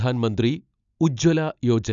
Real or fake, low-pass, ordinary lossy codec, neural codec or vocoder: real; 7.2 kHz; none; none